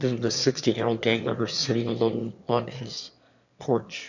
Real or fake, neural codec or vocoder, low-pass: fake; autoencoder, 22.05 kHz, a latent of 192 numbers a frame, VITS, trained on one speaker; 7.2 kHz